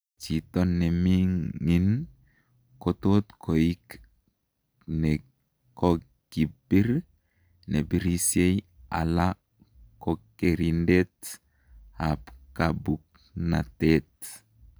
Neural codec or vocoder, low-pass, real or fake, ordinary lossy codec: none; none; real; none